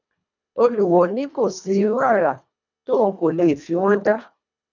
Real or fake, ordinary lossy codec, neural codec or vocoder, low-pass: fake; none; codec, 24 kHz, 1.5 kbps, HILCodec; 7.2 kHz